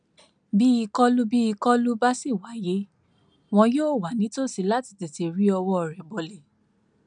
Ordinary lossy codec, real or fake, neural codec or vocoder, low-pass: none; real; none; 9.9 kHz